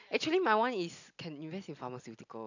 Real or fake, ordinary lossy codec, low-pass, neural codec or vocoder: real; none; 7.2 kHz; none